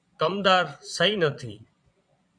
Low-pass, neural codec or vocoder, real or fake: 9.9 kHz; vocoder, 22.05 kHz, 80 mel bands, Vocos; fake